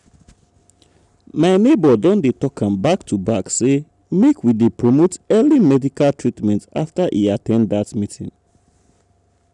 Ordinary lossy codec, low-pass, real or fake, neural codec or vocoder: none; 10.8 kHz; real; none